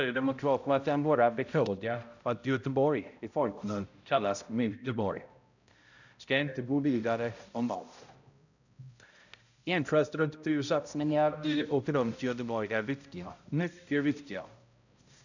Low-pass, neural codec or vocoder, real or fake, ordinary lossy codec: 7.2 kHz; codec, 16 kHz, 0.5 kbps, X-Codec, HuBERT features, trained on balanced general audio; fake; none